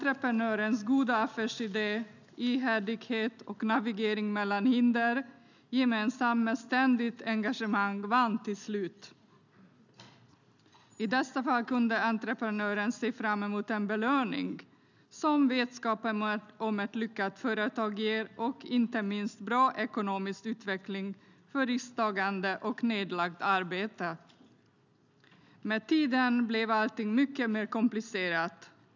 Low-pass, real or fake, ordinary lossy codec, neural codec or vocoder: 7.2 kHz; real; none; none